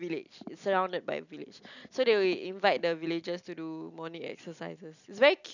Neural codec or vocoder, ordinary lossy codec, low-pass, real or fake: none; none; 7.2 kHz; real